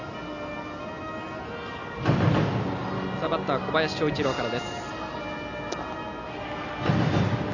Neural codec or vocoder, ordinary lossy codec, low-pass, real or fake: none; none; 7.2 kHz; real